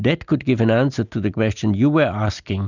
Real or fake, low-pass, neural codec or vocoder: real; 7.2 kHz; none